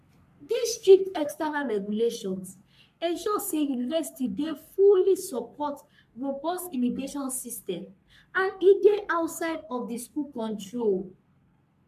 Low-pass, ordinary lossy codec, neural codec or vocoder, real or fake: 14.4 kHz; MP3, 96 kbps; codec, 44.1 kHz, 3.4 kbps, Pupu-Codec; fake